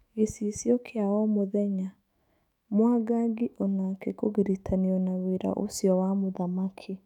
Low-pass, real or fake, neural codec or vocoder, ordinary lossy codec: 19.8 kHz; fake; autoencoder, 48 kHz, 128 numbers a frame, DAC-VAE, trained on Japanese speech; none